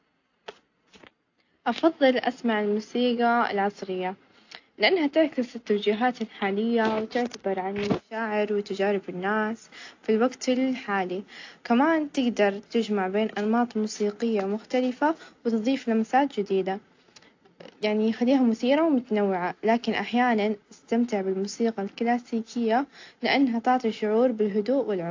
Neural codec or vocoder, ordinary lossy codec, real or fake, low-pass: none; AAC, 48 kbps; real; 7.2 kHz